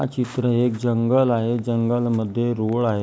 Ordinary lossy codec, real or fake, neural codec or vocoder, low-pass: none; real; none; none